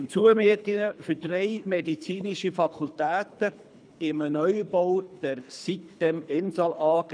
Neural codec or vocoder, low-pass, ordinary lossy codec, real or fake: codec, 24 kHz, 3 kbps, HILCodec; 9.9 kHz; none; fake